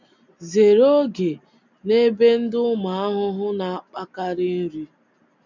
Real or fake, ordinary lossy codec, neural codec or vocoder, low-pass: real; none; none; 7.2 kHz